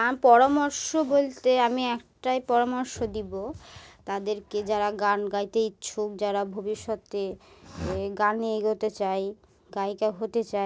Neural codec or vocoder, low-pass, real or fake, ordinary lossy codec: none; none; real; none